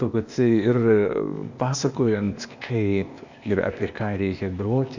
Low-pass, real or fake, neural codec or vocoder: 7.2 kHz; fake; codec, 16 kHz, 0.8 kbps, ZipCodec